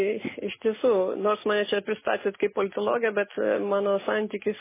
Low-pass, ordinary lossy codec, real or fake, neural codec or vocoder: 3.6 kHz; MP3, 16 kbps; real; none